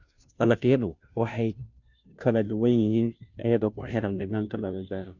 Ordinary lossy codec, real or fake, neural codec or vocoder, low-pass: none; fake; codec, 16 kHz, 0.5 kbps, FunCodec, trained on Chinese and English, 25 frames a second; 7.2 kHz